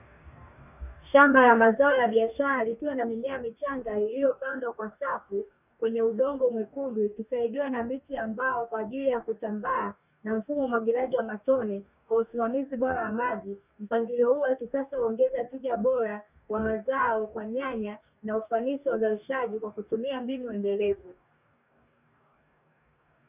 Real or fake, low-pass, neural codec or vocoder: fake; 3.6 kHz; codec, 44.1 kHz, 2.6 kbps, DAC